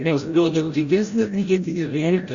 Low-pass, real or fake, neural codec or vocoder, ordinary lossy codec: 7.2 kHz; fake; codec, 16 kHz, 0.5 kbps, FreqCodec, larger model; Opus, 64 kbps